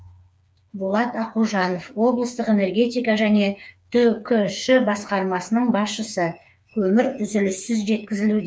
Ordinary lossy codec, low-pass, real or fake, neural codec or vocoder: none; none; fake; codec, 16 kHz, 4 kbps, FreqCodec, smaller model